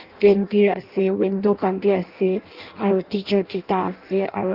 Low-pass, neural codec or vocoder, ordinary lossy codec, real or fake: 5.4 kHz; codec, 16 kHz in and 24 kHz out, 0.6 kbps, FireRedTTS-2 codec; Opus, 32 kbps; fake